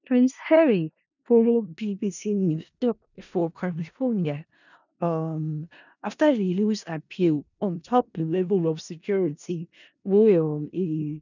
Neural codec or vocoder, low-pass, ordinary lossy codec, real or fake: codec, 16 kHz in and 24 kHz out, 0.4 kbps, LongCat-Audio-Codec, four codebook decoder; 7.2 kHz; none; fake